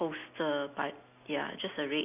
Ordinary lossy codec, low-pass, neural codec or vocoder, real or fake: none; 3.6 kHz; none; real